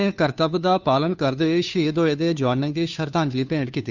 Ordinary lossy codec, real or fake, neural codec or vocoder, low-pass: none; fake; codec, 16 kHz, 2 kbps, FunCodec, trained on Chinese and English, 25 frames a second; 7.2 kHz